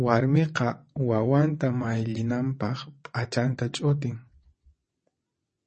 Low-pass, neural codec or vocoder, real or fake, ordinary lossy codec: 9.9 kHz; vocoder, 22.05 kHz, 80 mel bands, WaveNeXt; fake; MP3, 32 kbps